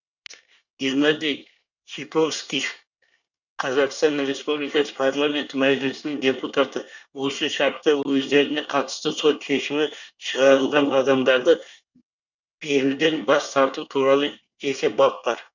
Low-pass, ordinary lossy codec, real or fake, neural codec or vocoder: 7.2 kHz; none; fake; codec, 24 kHz, 1 kbps, SNAC